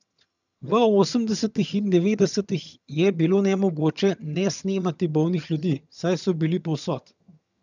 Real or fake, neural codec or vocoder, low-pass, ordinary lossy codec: fake; vocoder, 22.05 kHz, 80 mel bands, HiFi-GAN; 7.2 kHz; none